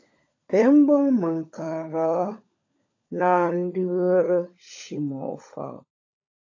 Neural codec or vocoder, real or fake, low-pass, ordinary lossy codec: codec, 16 kHz, 16 kbps, FunCodec, trained on LibriTTS, 50 frames a second; fake; 7.2 kHz; AAC, 48 kbps